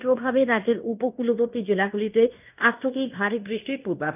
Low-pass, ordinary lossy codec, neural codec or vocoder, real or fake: 3.6 kHz; none; codec, 16 kHz in and 24 kHz out, 0.9 kbps, LongCat-Audio-Codec, fine tuned four codebook decoder; fake